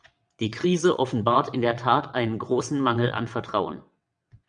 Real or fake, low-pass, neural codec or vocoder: fake; 9.9 kHz; vocoder, 22.05 kHz, 80 mel bands, WaveNeXt